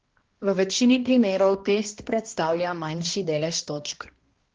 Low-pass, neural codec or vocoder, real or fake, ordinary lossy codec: 7.2 kHz; codec, 16 kHz, 1 kbps, X-Codec, HuBERT features, trained on balanced general audio; fake; Opus, 16 kbps